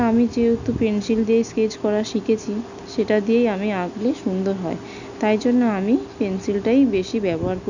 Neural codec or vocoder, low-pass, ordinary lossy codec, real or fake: none; 7.2 kHz; none; real